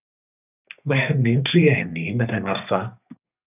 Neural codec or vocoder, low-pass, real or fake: codec, 32 kHz, 1.9 kbps, SNAC; 3.6 kHz; fake